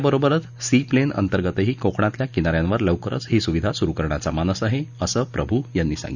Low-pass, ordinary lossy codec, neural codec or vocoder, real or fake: 7.2 kHz; none; none; real